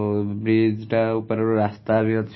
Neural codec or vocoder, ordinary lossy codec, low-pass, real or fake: none; MP3, 24 kbps; 7.2 kHz; real